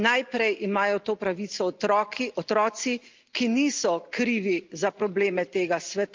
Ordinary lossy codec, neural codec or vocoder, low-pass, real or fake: Opus, 24 kbps; none; 7.2 kHz; real